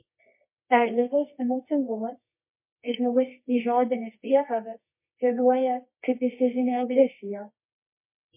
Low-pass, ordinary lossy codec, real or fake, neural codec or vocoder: 3.6 kHz; MP3, 24 kbps; fake; codec, 24 kHz, 0.9 kbps, WavTokenizer, medium music audio release